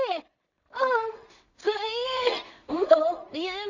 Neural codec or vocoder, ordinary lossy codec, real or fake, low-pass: codec, 16 kHz in and 24 kHz out, 0.4 kbps, LongCat-Audio-Codec, two codebook decoder; none; fake; 7.2 kHz